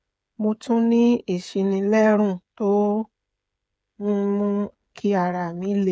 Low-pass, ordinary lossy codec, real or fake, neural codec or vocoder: none; none; fake; codec, 16 kHz, 8 kbps, FreqCodec, smaller model